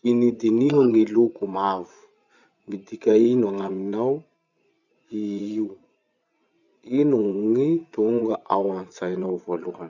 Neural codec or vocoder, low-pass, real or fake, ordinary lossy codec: vocoder, 24 kHz, 100 mel bands, Vocos; 7.2 kHz; fake; none